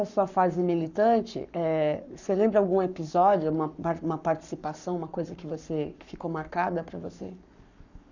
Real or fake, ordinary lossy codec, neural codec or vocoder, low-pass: fake; none; codec, 44.1 kHz, 7.8 kbps, Pupu-Codec; 7.2 kHz